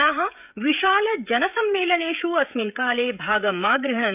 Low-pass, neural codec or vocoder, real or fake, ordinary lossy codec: 3.6 kHz; codec, 16 kHz, 16 kbps, FreqCodec, smaller model; fake; none